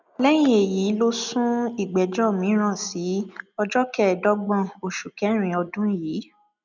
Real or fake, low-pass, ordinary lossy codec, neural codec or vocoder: real; 7.2 kHz; none; none